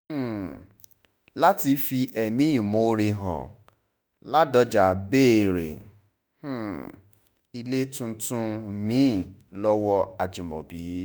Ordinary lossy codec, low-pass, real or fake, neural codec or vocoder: none; none; fake; autoencoder, 48 kHz, 32 numbers a frame, DAC-VAE, trained on Japanese speech